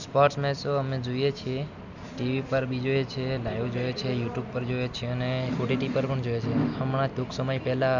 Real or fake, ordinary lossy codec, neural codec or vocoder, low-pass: real; none; none; 7.2 kHz